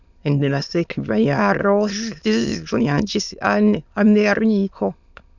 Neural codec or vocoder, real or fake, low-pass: autoencoder, 22.05 kHz, a latent of 192 numbers a frame, VITS, trained on many speakers; fake; 7.2 kHz